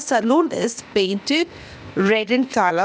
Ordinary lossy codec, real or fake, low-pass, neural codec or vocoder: none; fake; none; codec, 16 kHz, 0.8 kbps, ZipCodec